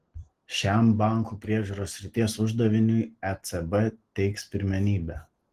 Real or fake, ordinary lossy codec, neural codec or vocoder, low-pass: real; Opus, 16 kbps; none; 14.4 kHz